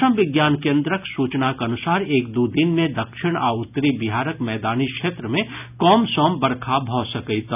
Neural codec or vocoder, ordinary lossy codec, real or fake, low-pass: none; none; real; 3.6 kHz